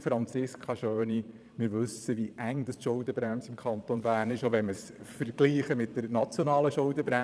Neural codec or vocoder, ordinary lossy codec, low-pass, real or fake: vocoder, 22.05 kHz, 80 mel bands, Vocos; none; none; fake